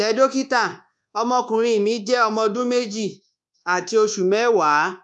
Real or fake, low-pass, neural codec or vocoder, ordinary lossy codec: fake; 10.8 kHz; codec, 24 kHz, 1.2 kbps, DualCodec; none